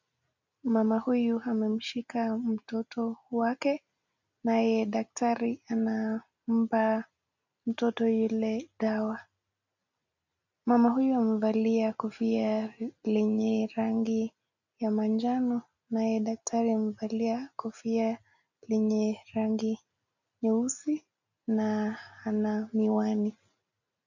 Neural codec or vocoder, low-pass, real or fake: none; 7.2 kHz; real